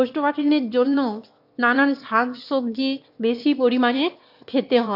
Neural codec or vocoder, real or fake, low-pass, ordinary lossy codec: autoencoder, 22.05 kHz, a latent of 192 numbers a frame, VITS, trained on one speaker; fake; 5.4 kHz; AAC, 48 kbps